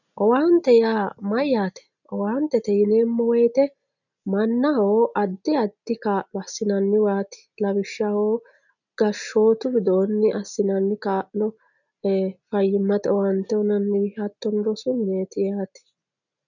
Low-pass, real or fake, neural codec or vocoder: 7.2 kHz; real; none